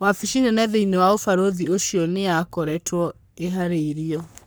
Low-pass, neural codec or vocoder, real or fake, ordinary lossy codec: none; codec, 44.1 kHz, 3.4 kbps, Pupu-Codec; fake; none